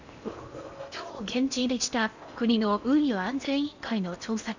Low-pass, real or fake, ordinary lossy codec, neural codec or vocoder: 7.2 kHz; fake; Opus, 64 kbps; codec, 16 kHz in and 24 kHz out, 0.8 kbps, FocalCodec, streaming, 65536 codes